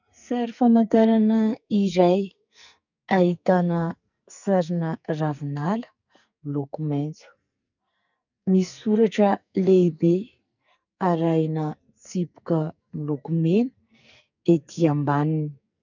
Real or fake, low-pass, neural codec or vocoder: fake; 7.2 kHz; codec, 44.1 kHz, 2.6 kbps, SNAC